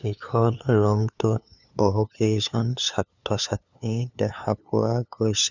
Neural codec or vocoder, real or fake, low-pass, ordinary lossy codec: codec, 16 kHz, 2 kbps, FunCodec, trained on LibriTTS, 25 frames a second; fake; 7.2 kHz; none